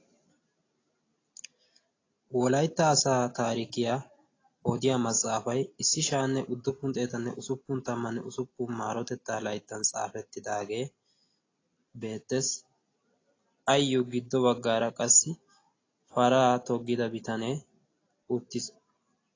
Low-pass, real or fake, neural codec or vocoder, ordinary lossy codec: 7.2 kHz; real; none; AAC, 32 kbps